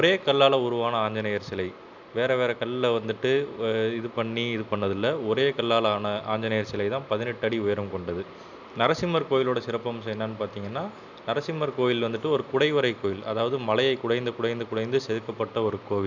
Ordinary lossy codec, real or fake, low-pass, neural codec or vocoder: none; real; 7.2 kHz; none